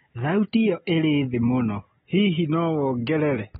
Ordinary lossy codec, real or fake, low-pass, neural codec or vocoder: AAC, 16 kbps; real; 10.8 kHz; none